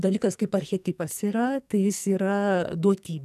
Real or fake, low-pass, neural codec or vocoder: fake; 14.4 kHz; codec, 44.1 kHz, 2.6 kbps, SNAC